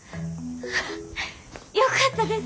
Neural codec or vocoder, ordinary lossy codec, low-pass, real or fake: none; none; none; real